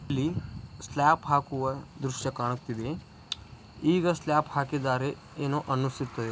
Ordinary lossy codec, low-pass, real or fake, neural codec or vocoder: none; none; real; none